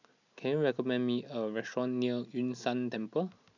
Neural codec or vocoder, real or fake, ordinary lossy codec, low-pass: none; real; none; 7.2 kHz